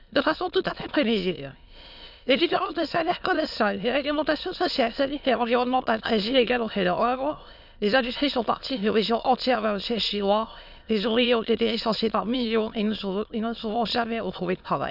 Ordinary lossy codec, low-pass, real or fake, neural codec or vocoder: none; 5.4 kHz; fake; autoencoder, 22.05 kHz, a latent of 192 numbers a frame, VITS, trained on many speakers